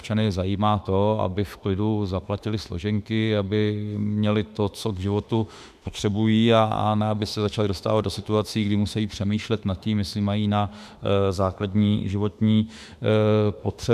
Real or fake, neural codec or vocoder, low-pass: fake; autoencoder, 48 kHz, 32 numbers a frame, DAC-VAE, trained on Japanese speech; 14.4 kHz